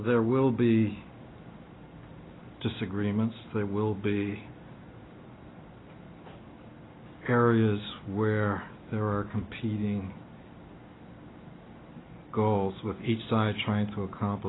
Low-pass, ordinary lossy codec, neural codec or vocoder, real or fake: 7.2 kHz; AAC, 16 kbps; none; real